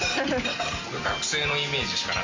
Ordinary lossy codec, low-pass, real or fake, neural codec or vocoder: MP3, 32 kbps; 7.2 kHz; real; none